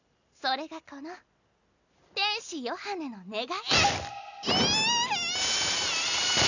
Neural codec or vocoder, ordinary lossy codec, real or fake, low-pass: none; none; real; 7.2 kHz